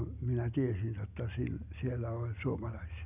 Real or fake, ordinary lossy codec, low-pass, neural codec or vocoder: real; none; 3.6 kHz; none